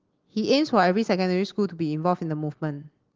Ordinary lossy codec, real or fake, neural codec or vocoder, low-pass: Opus, 16 kbps; real; none; 7.2 kHz